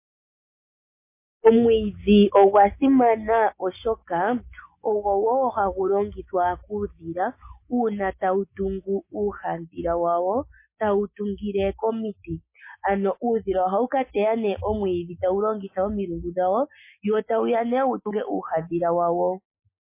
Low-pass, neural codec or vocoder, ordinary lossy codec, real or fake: 3.6 kHz; none; MP3, 24 kbps; real